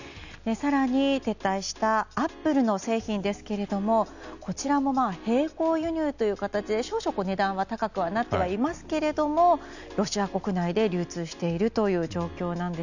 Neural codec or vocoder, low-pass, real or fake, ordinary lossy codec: none; 7.2 kHz; real; none